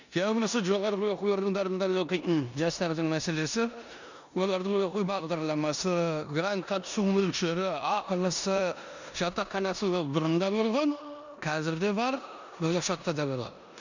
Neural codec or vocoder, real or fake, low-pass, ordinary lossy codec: codec, 16 kHz in and 24 kHz out, 0.9 kbps, LongCat-Audio-Codec, four codebook decoder; fake; 7.2 kHz; none